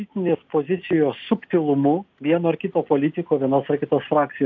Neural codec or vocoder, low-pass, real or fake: none; 7.2 kHz; real